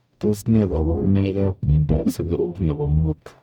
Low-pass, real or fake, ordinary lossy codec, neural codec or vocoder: 19.8 kHz; fake; none; codec, 44.1 kHz, 0.9 kbps, DAC